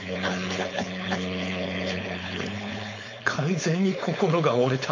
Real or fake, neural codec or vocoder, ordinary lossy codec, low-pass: fake; codec, 16 kHz, 4.8 kbps, FACodec; MP3, 48 kbps; 7.2 kHz